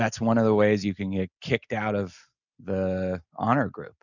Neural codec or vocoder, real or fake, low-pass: none; real; 7.2 kHz